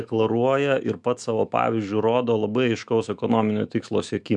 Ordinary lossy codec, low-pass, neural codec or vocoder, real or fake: MP3, 96 kbps; 10.8 kHz; autoencoder, 48 kHz, 128 numbers a frame, DAC-VAE, trained on Japanese speech; fake